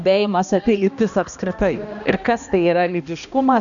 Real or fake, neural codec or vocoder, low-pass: fake; codec, 16 kHz, 1 kbps, X-Codec, HuBERT features, trained on balanced general audio; 7.2 kHz